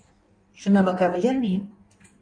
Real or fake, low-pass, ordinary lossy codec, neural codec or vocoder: fake; 9.9 kHz; AAC, 64 kbps; codec, 16 kHz in and 24 kHz out, 1.1 kbps, FireRedTTS-2 codec